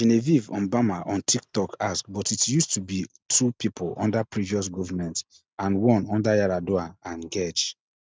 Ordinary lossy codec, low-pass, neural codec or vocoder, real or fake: none; none; none; real